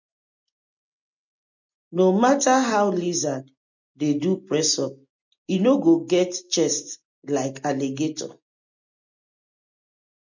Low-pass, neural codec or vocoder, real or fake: 7.2 kHz; none; real